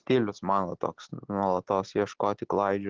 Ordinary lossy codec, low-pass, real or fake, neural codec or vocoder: Opus, 16 kbps; 7.2 kHz; real; none